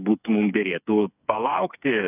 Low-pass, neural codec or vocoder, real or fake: 3.6 kHz; codec, 16 kHz, 4 kbps, FreqCodec, smaller model; fake